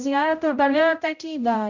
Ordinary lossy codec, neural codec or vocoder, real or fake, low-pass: none; codec, 16 kHz, 0.5 kbps, X-Codec, HuBERT features, trained on general audio; fake; 7.2 kHz